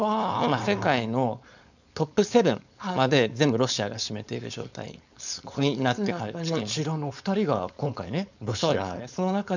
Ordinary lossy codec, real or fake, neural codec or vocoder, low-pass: none; fake; codec, 16 kHz, 4.8 kbps, FACodec; 7.2 kHz